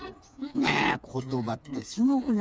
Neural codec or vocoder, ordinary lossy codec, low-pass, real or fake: codec, 16 kHz, 4 kbps, FreqCodec, smaller model; none; none; fake